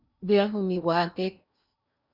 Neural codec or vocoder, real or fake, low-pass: codec, 16 kHz in and 24 kHz out, 0.8 kbps, FocalCodec, streaming, 65536 codes; fake; 5.4 kHz